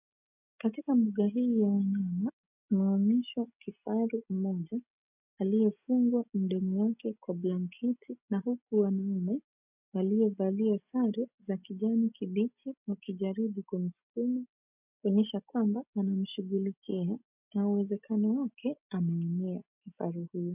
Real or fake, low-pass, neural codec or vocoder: real; 3.6 kHz; none